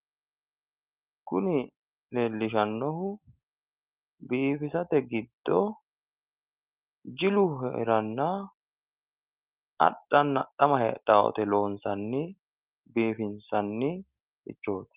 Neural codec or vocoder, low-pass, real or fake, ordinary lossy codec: none; 3.6 kHz; real; Opus, 32 kbps